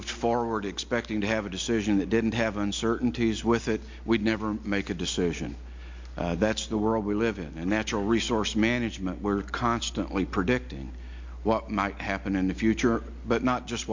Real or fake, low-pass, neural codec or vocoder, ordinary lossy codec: real; 7.2 kHz; none; MP3, 48 kbps